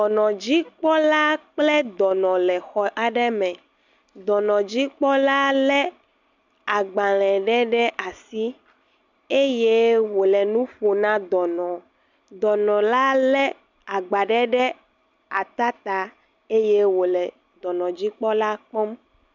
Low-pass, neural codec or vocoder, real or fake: 7.2 kHz; none; real